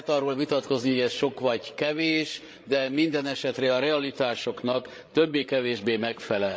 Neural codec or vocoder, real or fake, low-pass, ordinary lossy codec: codec, 16 kHz, 16 kbps, FreqCodec, larger model; fake; none; none